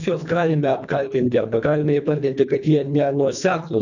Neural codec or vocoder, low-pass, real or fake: codec, 24 kHz, 1.5 kbps, HILCodec; 7.2 kHz; fake